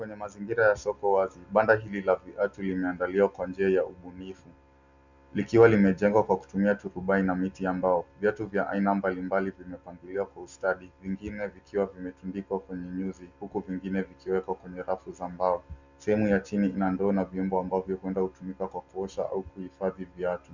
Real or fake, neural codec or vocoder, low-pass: real; none; 7.2 kHz